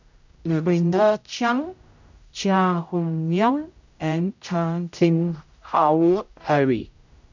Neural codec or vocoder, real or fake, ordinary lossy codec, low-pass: codec, 16 kHz, 0.5 kbps, X-Codec, HuBERT features, trained on general audio; fake; none; 7.2 kHz